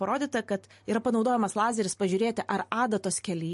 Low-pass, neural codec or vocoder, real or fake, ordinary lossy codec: 14.4 kHz; none; real; MP3, 48 kbps